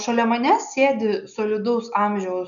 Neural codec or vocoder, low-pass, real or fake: none; 7.2 kHz; real